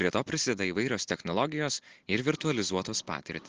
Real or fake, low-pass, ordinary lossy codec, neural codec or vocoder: real; 7.2 kHz; Opus, 16 kbps; none